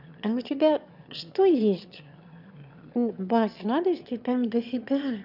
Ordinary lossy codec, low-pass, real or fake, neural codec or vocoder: none; 5.4 kHz; fake; autoencoder, 22.05 kHz, a latent of 192 numbers a frame, VITS, trained on one speaker